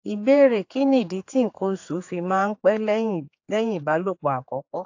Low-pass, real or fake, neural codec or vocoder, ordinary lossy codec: 7.2 kHz; fake; codec, 16 kHz, 4 kbps, X-Codec, HuBERT features, trained on general audio; AAC, 48 kbps